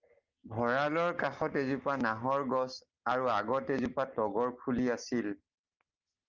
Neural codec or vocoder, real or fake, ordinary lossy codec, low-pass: none; real; Opus, 32 kbps; 7.2 kHz